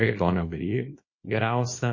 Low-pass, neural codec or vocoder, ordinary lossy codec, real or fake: 7.2 kHz; codec, 24 kHz, 0.9 kbps, WavTokenizer, small release; MP3, 32 kbps; fake